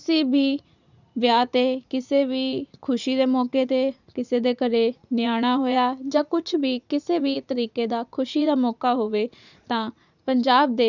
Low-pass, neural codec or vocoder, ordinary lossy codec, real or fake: 7.2 kHz; vocoder, 44.1 kHz, 80 mel bands, Vocos; none; fake